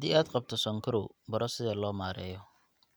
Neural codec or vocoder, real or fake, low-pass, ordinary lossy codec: none; real; none; none